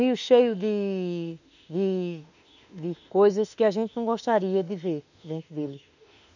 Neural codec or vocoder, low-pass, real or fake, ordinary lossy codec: autoencoder, 48 kHz, 32 numbers a frame, DAC-VAE, trained on Japanese speech; 7.2 kHz; fake; none